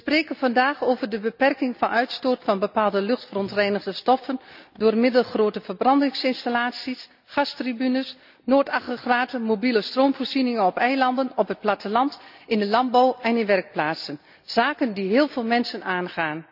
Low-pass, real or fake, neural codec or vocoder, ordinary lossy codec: 5.4 kHz; real; none; none